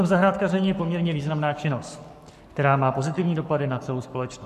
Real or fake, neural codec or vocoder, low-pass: fake; codec, 44.1 kHz, 7.8 kbps, Pupu-Codec; 14.4 kHz